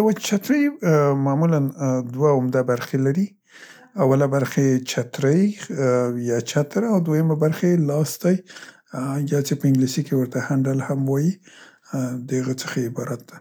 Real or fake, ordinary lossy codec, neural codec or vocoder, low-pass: real; none; none; none